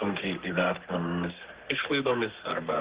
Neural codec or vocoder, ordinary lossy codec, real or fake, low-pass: codec, 32 kHz, 1.9 kbps, SNAC; Opus, 16 kbps; fake; 3.6 kHz